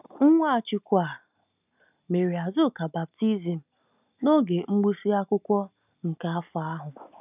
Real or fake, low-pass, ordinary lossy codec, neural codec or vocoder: real; 3.6 kHz; none; none